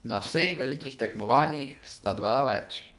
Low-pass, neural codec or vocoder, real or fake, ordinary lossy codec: 10.8 kHz; codec, 24 kHz, 1.5 kbps, HILCodec; fake; none